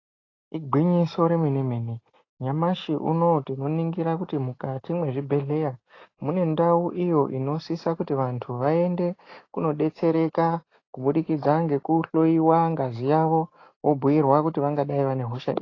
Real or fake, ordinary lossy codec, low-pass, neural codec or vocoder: real; AAC, 32 kbps; 7.2 kHz; none